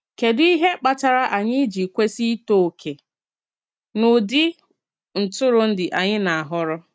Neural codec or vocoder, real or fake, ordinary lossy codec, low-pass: none; real; none; none